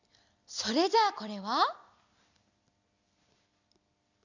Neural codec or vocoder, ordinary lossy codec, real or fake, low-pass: none; none; real; 7.2 kHz